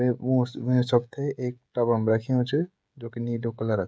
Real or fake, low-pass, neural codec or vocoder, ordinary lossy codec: fake; none; codec, 16 kHz, 16 kbps, FreqCodec, smaller model; none